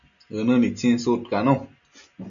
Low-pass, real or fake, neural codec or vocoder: 7.2 kHz; real; none